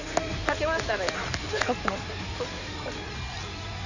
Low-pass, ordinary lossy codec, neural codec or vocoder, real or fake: 7.2 kHz; none; codec, 16 kHz in and 24 kHz out, 2.2 kbps, FireRedTTS-2 codec; fake